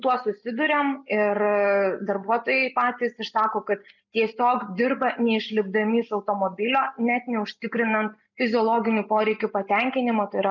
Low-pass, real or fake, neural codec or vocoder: 7.2 kHz; real; none